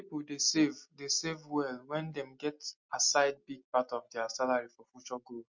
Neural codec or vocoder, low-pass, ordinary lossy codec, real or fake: none; 7.2 kHz; MP3, 48 kbps; real